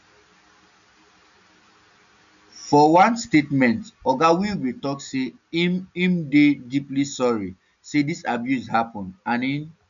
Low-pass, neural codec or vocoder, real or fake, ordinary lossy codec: 7.2 kHz; none; real; none